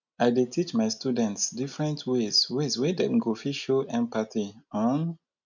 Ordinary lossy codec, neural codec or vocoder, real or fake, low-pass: none; none; real; 7.2 kHz